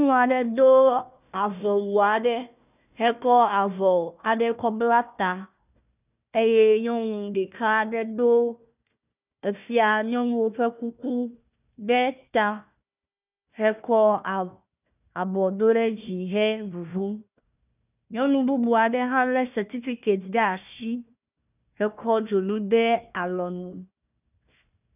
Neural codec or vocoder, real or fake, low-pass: codec, 16 kHz, 1 kbps, FunCodec, trained on Chinese and English, 50 frames a second; fake; 3.6 kHz